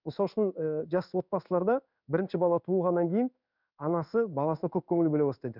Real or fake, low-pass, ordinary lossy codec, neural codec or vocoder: fake; 5.4 kHz; none; codec, 16 kHz in and 24 kHz out, 1 kbps, XY-Tokenizer